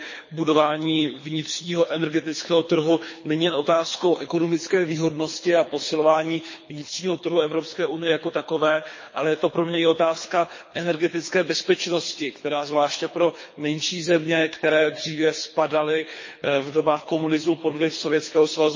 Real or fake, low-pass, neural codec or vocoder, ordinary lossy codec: fake; 7.2 kHz; codec, 24 kHz, 3 kbps, HILCodec; MP3, 32 kbps